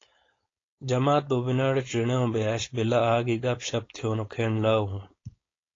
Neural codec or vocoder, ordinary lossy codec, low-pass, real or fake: codec, 16 kHz, 4.8 kbps, FACodec; AAC, 32 kbps; 7.2 kHz; fake